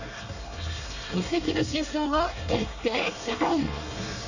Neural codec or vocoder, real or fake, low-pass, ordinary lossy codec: codec, 24 kHz, 1 kbps, SNAC; fake; 7.2 kHz; none